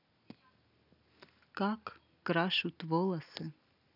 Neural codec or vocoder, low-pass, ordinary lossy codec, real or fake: none; 5.4 kHz; none; real